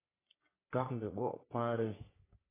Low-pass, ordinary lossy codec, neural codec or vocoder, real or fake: 3.6 kHz; AAC, 16 kbps; codec, 44.1 kHz, 3.4 kbps, Pupu-Codec; fake